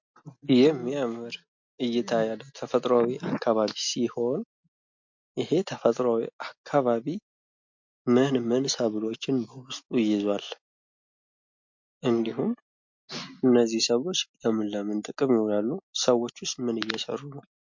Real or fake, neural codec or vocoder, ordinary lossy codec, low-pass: real; none; MP3, 48 kbps; 7.2 kHz